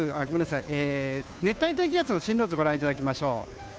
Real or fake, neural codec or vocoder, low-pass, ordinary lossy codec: fake; codec, 16 kHz, 2 kbps, FunCodec, trained on Chinese and English, 25 frames a second; none; none